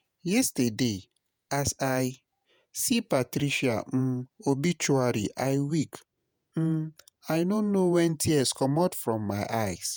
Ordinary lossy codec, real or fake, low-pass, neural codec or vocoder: none; fake; none; vocoder, 48 kHz, 128 mel bands, Vocos